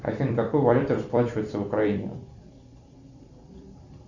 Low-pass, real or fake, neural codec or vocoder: 7.2 kHz; fake; vocoder, 44.1 kHz, 128 mel bands every 256 samples, BigVGAN v2